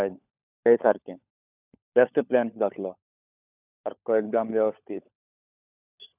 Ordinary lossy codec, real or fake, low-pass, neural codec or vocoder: none; fake; 3.6 kHz; codec, 16 kHz, 16 kbps, FunCodec, trained on LibriTTS, 50 frames a second